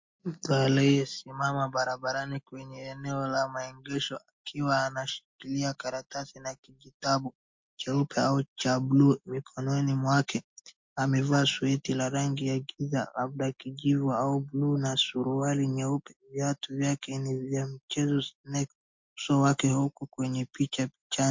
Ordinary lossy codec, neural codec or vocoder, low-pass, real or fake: MP3, 48 kbps; none; 7.2 kHz; real